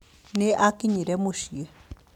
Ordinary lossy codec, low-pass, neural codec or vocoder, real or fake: none; 19.8 kHz; none; real